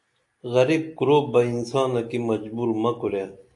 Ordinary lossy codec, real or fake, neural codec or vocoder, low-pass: AAC, 64 kbps; real; none; 10.8 kHz